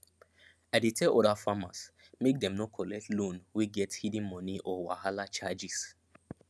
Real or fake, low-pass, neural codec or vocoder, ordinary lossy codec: real; none; none; none